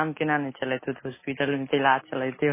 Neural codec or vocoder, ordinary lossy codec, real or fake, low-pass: none; MP3, 16 kbps; real; 3.6 kHz